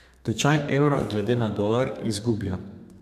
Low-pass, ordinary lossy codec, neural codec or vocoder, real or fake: 14.4 kHz; none; codec, 32 kHz, 1.9 kbps, SNAC; fake